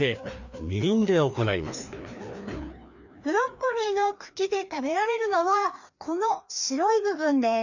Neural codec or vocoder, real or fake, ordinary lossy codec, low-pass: codec, 16 kHz, 2 kbps, FreqCodec, larger model; fake; none; 7.2 kHz